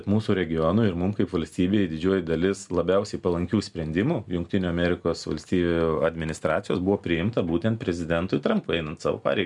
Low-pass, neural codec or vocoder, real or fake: 10.8 kHz; none; real